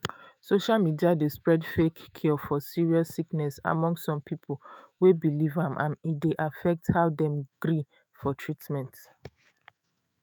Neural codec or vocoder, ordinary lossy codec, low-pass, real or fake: autoencoder, 48 kHz, 128 numbers a frame, DAC-VAE, trained on Japanese speech; none; none; fake